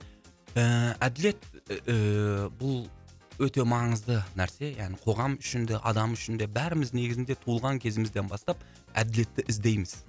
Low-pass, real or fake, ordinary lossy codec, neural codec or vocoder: none; real; none; none